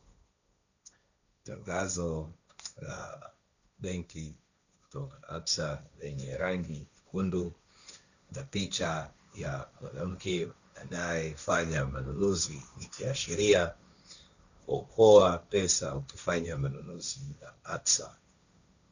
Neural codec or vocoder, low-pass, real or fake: codec, 16 kHz, 1.1 kbps, Voila-Tokenizer; 7.2 kHz; fake